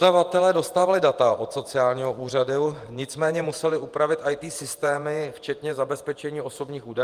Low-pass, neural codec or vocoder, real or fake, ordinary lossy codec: 14.4 kHz; vocoder, 48 kHz, 128 mel bands, Vocos; fake; Opus, 32 kbps